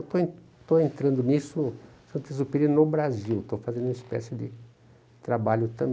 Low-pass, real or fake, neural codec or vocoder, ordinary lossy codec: none; real; none; none